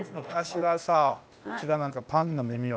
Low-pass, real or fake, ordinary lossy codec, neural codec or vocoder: none; fake; none; codec, 16 kHz, 0.8 kbps, ZipCodec